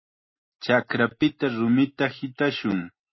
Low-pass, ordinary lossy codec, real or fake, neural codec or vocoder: 7.2 kHz; MP3, 24 kbps; real; none